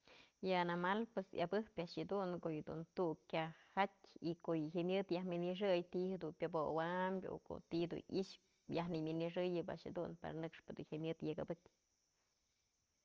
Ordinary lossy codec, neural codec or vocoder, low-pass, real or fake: Opus, 24 kbps; none; 7.2 kHz; real